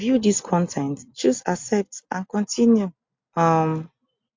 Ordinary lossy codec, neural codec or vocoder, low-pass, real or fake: MP3, 48 kbps; none; 7.2 kHz; real